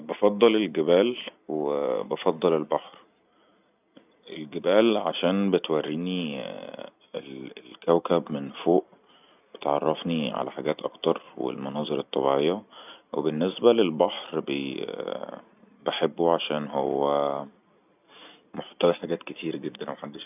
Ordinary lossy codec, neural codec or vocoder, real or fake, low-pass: none; vocoder, 44.1 kHz, 128 mel bands every 256 samples, BigVGAN v2; fake; 3.6 kHz